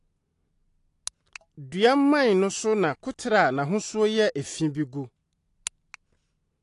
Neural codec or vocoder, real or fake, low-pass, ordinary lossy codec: none; real; 10.8 kHz; AAC, 48 kbps